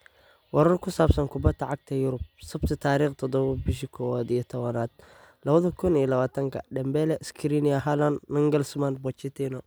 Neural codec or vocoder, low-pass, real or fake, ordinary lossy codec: none; none; real; none